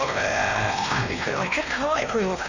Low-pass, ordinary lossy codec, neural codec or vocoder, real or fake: 7.2 kHz; none; codec, 16 kHz, 1 kbps, X-Codec, WavLM features, trained on Multilingual LibriSpeech; fake